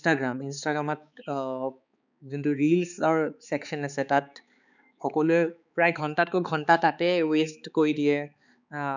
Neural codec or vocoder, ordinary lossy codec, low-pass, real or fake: codec, 16 kHz, 4 kbps, X-Codec, HuBERT features, trained on balanced general audio; none; 7.2 kHz; fake